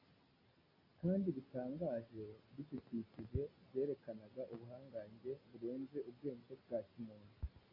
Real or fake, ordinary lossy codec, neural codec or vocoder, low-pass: real; AAC, 32 kbps; none; 5.4 kHz